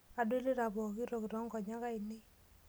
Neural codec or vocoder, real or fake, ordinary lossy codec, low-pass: none; real; none; none